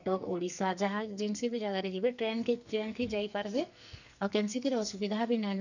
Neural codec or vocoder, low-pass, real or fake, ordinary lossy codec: codec, 44.1 kHz, 2.6 kbps, SNAC; 7.2 kHz; fake; none